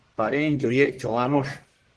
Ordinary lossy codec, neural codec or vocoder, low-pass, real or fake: Opus, 16 kbps; codec, 44.1 kHz, 1.7 kbps, Pupu-Codec; 10.8 kHz; fake